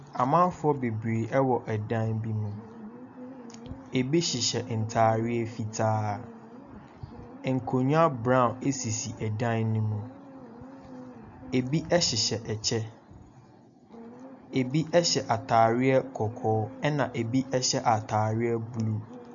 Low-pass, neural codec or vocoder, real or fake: 7.2 kHz; none; real